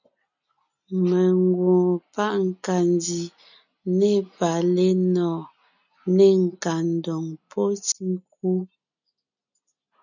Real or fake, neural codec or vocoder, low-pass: real; none; 7.2 kHz